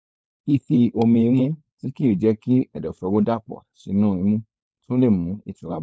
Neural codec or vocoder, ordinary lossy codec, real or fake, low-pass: codec, 16 kHz, 4.8 kbps, FACodec; none; fake; none